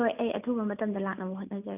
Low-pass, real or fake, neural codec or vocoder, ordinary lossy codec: 3.6 kHz; real; none; none